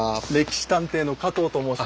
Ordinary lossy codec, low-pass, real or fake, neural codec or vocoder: none; none; real; none